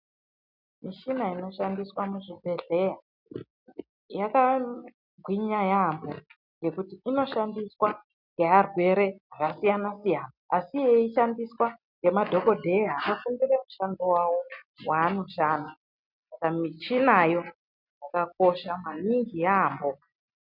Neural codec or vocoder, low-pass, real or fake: none; 5.4 kHz; real